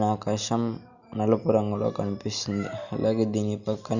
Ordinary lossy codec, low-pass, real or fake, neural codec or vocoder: none; 7.2 kHz; real; none